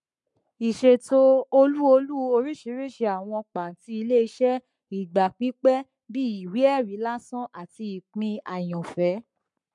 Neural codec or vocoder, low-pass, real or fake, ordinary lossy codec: codec, 44.1 kHz, 7.8 kbps, Pupu-Codec; 10.8 kHz; fake; MP3, 64 kbps